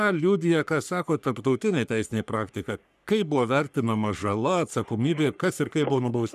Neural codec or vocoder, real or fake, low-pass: codec, 44.1 kHz, 3.4 kbps, Pupu-Codec; fake; 14.4 kHz